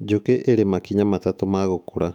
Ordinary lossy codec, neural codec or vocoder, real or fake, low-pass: none; autoencoder, 48 kHz, 128 numbers a frame, DAC-VAE, trained on Japanese speech; fake; 19.8 kHz